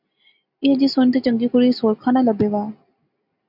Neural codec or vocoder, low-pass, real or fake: none; 5.4 kHz; real